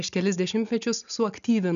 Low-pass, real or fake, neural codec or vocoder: 7.2 kHz; real; none